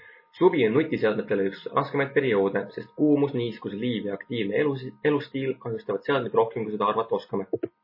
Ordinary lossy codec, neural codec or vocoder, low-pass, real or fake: MP3, 24 kbps; none; 5.4 kHz; real